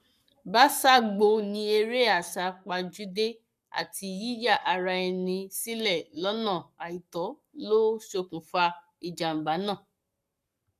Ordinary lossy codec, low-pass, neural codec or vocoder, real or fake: none; 14.4 kHz; codec, 44.1 kHz, 7.8 kbps, Pupu-Codec; fake